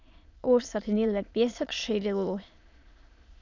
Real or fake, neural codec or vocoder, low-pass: fake; autoencoder, 22.05 kHz, a latent of 192 numbers a frame, VITS, trained on many speakers; 7.2 kHz